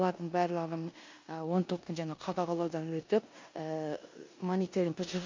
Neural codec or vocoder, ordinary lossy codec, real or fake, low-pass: codec, 16 kHz in and 24 kHz out, 0.9 kbps, LongCat-Audio-Codec, four codebook decoder; MP3, 48 kbps; fake; 7.2 kHz